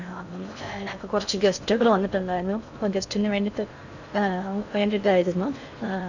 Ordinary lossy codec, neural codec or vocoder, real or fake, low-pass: none; codec, 16 kHz in and 24 kHz out, 0.6 kbps, FocalCodec, streaming, 4096 codes; fake; 7.2 kHz